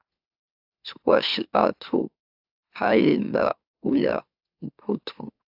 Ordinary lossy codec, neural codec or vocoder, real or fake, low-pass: AAC, 48 kbps; autoencoder, 44.1 kHz, a latent of 192 numbers a frame, MeloTTS; fake; 5.4 kHz